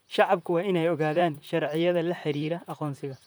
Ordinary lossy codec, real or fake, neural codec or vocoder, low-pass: none; fake; vocoder, 44.1 kHz, 128 mel bands, Pupu-Vocoder; none